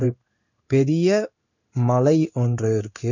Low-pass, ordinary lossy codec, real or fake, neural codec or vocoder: 7.2 kHz; none; fake; codec, 16 kHz in and 24 kHz out, 1 kbps, XY-Tokenizer